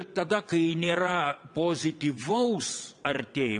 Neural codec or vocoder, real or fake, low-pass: vocoder, 22.05 kHz, 80 mel bands, WaveNeXt; fake; 9.9 kHz